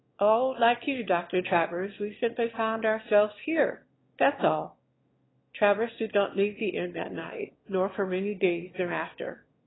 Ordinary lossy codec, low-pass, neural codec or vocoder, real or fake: AAC, 16 kbps; 7.2 kHz; autoencoder, 22.05 kHz, a latent of 192 numbers a frame, VITS, trained on one speaker; fake